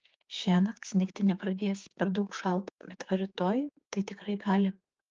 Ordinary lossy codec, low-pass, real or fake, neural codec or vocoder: Opus, 24 kbps; 7.2 kHz; fake; codec, 16 kHz, 4 kbps, X-Codec, HuBERT features, trained on general audio